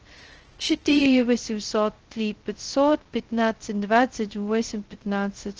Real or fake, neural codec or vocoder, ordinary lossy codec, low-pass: fake; codec, 16 kHz, 0.2 kbps, FocalCodec; Opus, 16 kbps; 7.2 kHz